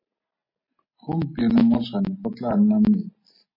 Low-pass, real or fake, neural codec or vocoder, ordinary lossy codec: 5.4 kHz; real; none; MP3, 24 kbps